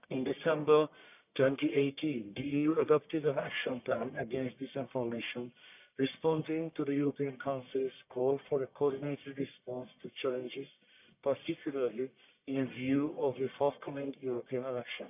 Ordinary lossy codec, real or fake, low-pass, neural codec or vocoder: none; fake; 3.6 kHz; codec, 44.1 kHz, 1.7 kbps, Pupu-Codec